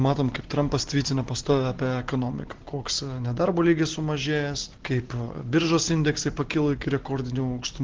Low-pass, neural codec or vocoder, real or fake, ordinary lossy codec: 7.2 kHz; none; real; Opus, 16 kbps